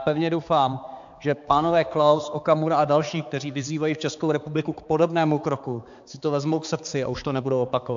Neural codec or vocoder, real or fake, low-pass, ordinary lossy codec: codec, 16 kHz, 4 kbps, X-Codec, HuBERT features, trained on balanced general audio; fake; 7.2 kHz; AAC, 64 kbps